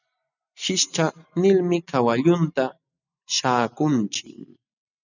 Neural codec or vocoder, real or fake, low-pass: none; real; 7.2 kHz